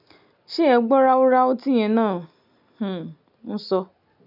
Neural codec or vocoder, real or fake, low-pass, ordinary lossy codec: none; real; 5.4 kHz; none